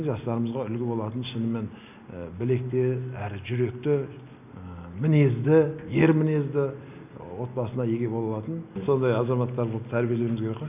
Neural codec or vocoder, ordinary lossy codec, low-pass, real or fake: none; none; 3.6 kHz; real